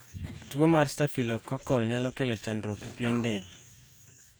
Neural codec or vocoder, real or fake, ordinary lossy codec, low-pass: codec, 44.1 kHz, 2.6 kbps, DAC; fake; none; none